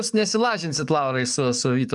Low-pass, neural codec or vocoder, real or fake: 10.8 kHz; codec, 44.1 kHz, 7.8 kbps, DAC; fake